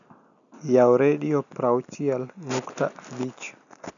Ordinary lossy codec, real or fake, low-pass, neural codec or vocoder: none; real; 7.2 kHz; none